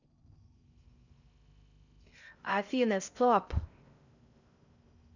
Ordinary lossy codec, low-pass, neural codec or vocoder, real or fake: none; 7.2 kHz; codec, 16 kHz in and 24 kHz out, 0.6 kbps, FocalCodec, streaming, 4096 codes; fake